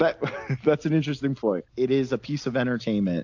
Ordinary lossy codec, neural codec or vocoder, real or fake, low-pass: AAC, 48 kbps; none; real; 7.2 kHz